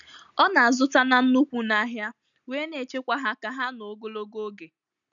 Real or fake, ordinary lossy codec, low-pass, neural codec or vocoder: real; none; 7.2 kHz; none